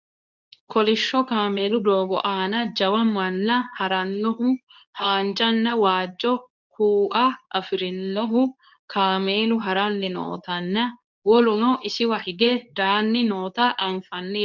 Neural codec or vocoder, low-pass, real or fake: codec, 24 kHz, 0.9 kbps, WavTokenizer, medium speech release version 2; 7.2 kHz; fake